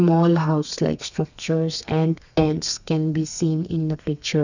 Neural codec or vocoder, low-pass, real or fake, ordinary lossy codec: codec, 32 kHz, 1.9 kbps, SNAC; 7.2 kHz; fake; none